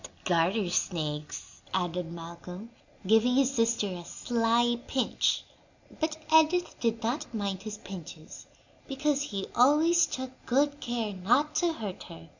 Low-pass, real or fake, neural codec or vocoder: 7.2 kHz; real; none